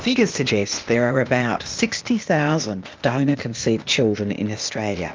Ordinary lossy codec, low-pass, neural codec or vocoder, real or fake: Opus, 32 kbps; 7.2 kHz; codec, 16 kHz, 0.8 kbps, ZipCodec; fake